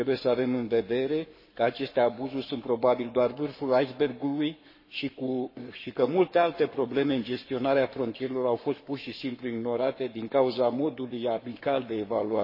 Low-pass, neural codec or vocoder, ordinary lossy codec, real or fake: 5.4 kHz; codec, 44.1 kHz, 7.8 kbps, Pupu-Codec; MP3, 24 kbps; fake